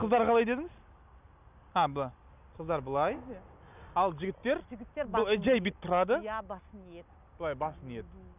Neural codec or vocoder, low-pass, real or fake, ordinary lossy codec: none; 3.6 kHz; real; none